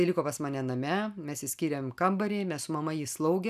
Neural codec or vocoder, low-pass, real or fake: none; 14.4 kHz; real